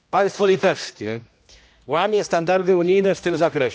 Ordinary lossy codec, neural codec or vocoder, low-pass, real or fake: none; codec, 16 kHz, 1 kbps, X-Codec, HuBERT features, trained on general audio; none; fake